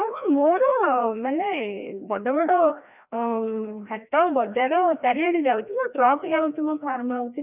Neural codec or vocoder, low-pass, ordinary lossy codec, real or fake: codec, 16 kHz, 1 kbps, FreqCodec, larger model; 3.6 kHz; none; fake